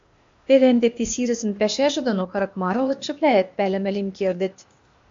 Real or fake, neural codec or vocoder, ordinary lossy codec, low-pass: fake; codec, 16 kHz, 0.8 kbps, ZipCodec; MP3, 48 kbps; 7.2 kHz